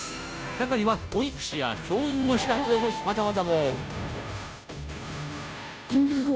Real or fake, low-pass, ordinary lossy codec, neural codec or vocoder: fake; none; none; codec, 16 kHz, 0.5 kbps, FunCodec, trained on Chinese and English, 25 frames a second